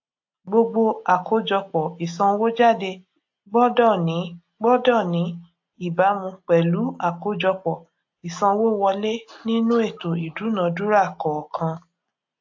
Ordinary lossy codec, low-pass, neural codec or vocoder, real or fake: AAC, 48 kbps; 7.2 kHz; none; real